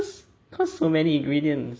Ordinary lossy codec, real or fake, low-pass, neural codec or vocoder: none; real; none; none